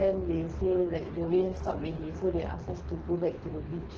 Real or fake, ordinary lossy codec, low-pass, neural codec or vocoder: fake; Opus, 16 kbps; 7.2 kHz; codec, 24 kHz, 3 kbps, HILCodec